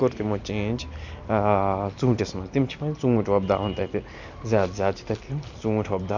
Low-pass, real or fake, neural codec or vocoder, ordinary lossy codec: 7.2 kHz; real; none; none